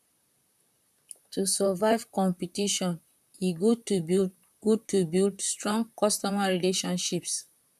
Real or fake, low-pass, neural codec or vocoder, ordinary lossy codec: fake; 14.4 kHz; vocoder, 44.1 kHz, 128 mel bands, Pupu-Vocoder; none